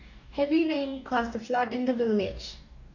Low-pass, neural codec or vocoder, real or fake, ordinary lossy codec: 7.2 kHz; codec, 44.1 kHz, 2.6 kbps, DAC; fake; none